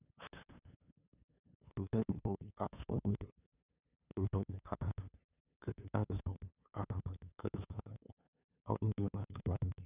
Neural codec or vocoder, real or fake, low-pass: codec, 16 kHz, 2 kbps, FunCodec, trained on LibriTTS, 25 frames a second; fake; 3.6 kHz